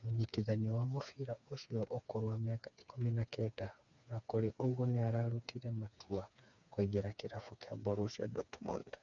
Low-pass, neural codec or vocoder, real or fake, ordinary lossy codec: 7.2 kHz; codec, 16 kHz, 4 kbps, FreqCodec, smaller model; fake; none